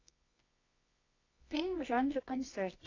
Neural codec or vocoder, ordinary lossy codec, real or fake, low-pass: codec, 24 kHz, 0.9 kbps, WavTokenizer, medium music audio release; AAC, 32 kbps; fake; 7.2 kHz